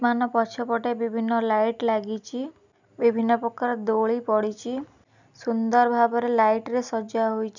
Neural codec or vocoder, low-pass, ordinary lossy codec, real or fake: none; 7.2 kHz; none; real